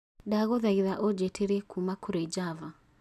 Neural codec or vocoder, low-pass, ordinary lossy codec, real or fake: none; 14.4 kHz; none; real